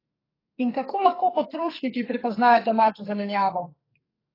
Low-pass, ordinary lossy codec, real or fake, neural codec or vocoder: 5.4 kHz; AAC, 24 kbps; fake; codec, 44.1 kHz, 2.6 kbps, SNAC